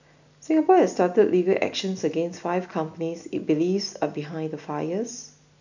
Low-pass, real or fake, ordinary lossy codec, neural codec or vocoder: 7.2 kHz; real; none; none